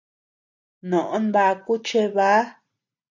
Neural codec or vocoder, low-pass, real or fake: none; 7.2 kHz; real